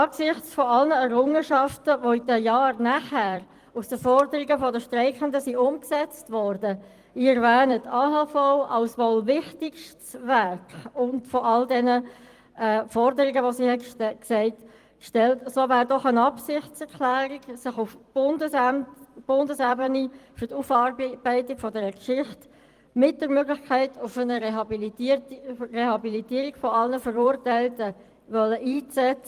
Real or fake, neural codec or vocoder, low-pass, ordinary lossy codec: fake; vocoder, 44.1 kHz, 128 mel bands every 256 samples, BigVGAN v2; 14.4 kHz; Opus, 24 kbps